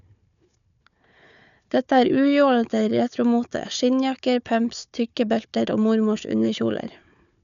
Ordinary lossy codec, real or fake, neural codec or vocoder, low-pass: none; fake; codec, 16 kHz, 4 kbps, FunCodec, trained on Chinese and English, 50 frames a second; 7.2 kHz